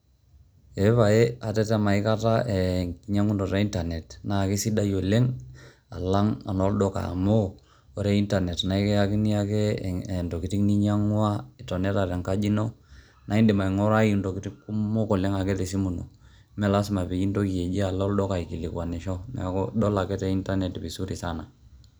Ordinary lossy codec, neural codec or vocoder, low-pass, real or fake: none; none; none; real